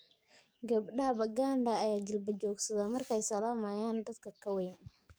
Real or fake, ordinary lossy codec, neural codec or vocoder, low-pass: fake; none; codec, 44.1 kHz, 7.8 kbps, DAC; none